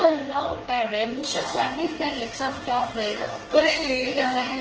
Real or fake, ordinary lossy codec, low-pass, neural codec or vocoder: fake; Opus, 16 kbps; 7.2 kHz; codec, 24 kHz, 1 kbps, SNAC